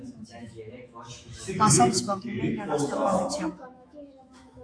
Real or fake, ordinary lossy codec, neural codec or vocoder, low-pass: fake; AAC, 48 kbps; autoencoder, 48 kHz, 128 numbers a frame, DAC-VAE, trained on Japanese speech; 9.9 kHz